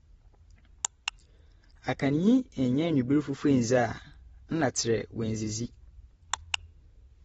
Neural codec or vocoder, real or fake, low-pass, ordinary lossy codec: vocoder, 44.1 kHz, 128 mel bands every 512 samples, BigVGAN v2; fake; 19.8 kHz; AAC, 24 kbps